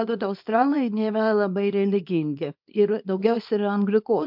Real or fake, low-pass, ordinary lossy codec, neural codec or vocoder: fake; 5.4 kHz; MP3, 48 kbps; codec, 24 kHz, 0.9 kbps, WavTokenizer, small release